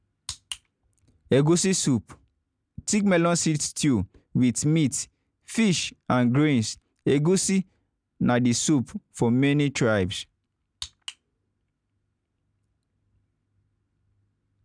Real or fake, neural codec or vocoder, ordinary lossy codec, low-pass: real; none; none; 9.9 kHz